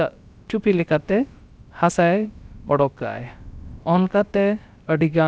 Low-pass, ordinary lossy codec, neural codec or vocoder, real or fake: none; none; codec, 16 kHz, about 1 kbps, DyCAST, with the encoder's durations; fake